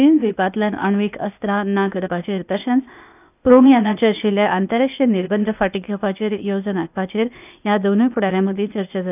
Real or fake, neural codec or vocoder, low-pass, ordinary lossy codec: fake; codec, 16 kHz, 0.8 kbps, ZipCodec; 3.6 kHz; none